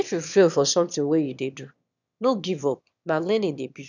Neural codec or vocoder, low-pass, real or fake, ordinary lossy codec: autoencoder, 22.05 kHz, a latent of 192 numbers a frame, VITS, trained on one speaker; 7.2 kHz; fake; none